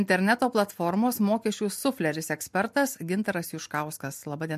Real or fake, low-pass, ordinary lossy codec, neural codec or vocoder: real; 14.4 kHz; MP3, 64 kbps; none